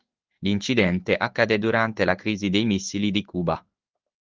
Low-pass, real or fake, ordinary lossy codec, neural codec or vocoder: 7.2 kHz; fake; Opus, 32 kbps; codec, 16 kHz in and 24 kHz out, 1 kbps, XY-Tokenizer